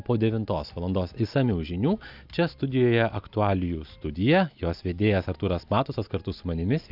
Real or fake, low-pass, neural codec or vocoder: real; 5.4 kHz; none